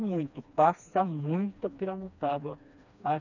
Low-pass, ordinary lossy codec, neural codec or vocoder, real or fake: 7.2 kHz; none; codec, 16 kHz, 2 kbps, FreqCodec, smaller model; fake